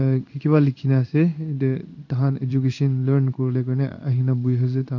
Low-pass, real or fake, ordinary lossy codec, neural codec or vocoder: 7.2 kHz; fake; none; codec, 16 kHz in and 24 kHz out, 1 kbps, XY-Tokenizer